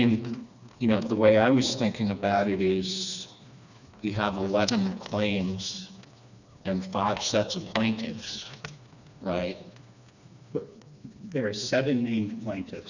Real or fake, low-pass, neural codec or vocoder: fake; 7.2 kHz; codec, 16 kHz, 2 kbps, FreqCodec, smaller model